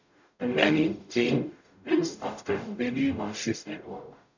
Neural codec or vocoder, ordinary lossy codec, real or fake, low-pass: codec, 44.1 kHz, 0.9 kbps, DAC; none; fake; 7.2 kHz